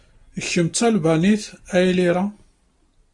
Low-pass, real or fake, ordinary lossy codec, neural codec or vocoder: 10.8 kHz; real; AAC, 64 kbps; none